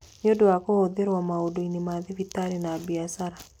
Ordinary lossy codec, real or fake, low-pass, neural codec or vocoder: none; real; 19.8 kHz; none